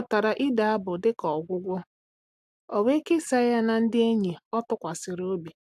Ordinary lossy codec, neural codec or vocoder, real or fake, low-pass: AAC, 96 kbps; none; real; 14.4 kHz